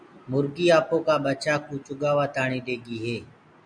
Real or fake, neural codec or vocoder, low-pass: real; none; 9.9 kHz